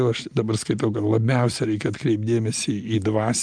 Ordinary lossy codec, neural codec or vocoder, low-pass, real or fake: Opus, 32 kbps; none; 9.9 kHz; real